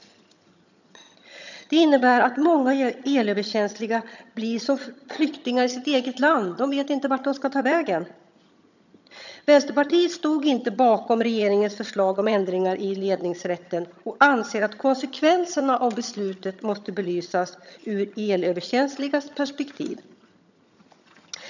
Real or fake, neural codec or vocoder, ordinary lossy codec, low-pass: fake; vocoder, 22.05 kHz, 80 mel bands, HiFi-GAN; none; 7.2 kHz